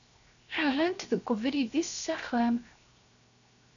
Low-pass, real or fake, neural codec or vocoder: 7.2 kHz; fake; codec, 16 kHz, 0.7 kbps, FocalCodec